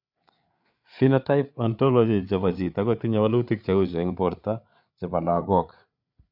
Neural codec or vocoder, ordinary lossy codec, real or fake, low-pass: codec, 16 kHz, 8 kbps, FreqCodec, larger model; none; fake; 5.4 kHz